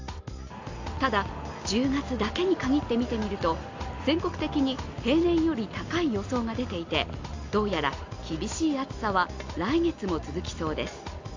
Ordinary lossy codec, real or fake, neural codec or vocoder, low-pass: AAC, 48 kbps; real; none; 7.2 kHz